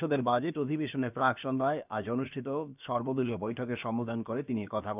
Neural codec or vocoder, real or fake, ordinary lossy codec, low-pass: codec, 16 kHz, about 1 kbps, DyCAST, with the encoder's durations; fake; none; 3.6 kHz